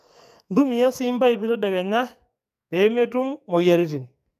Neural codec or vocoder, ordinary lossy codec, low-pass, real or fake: codec, 44.1 kHz, 2.6 kbps, SNAC; none; 14.4 kHz; fake